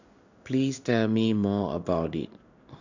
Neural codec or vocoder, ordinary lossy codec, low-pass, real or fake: codec, 16 kHz in and 24 kHz out, 1 kbps, XY-Tokenizer; none; 7.2 kHz; fake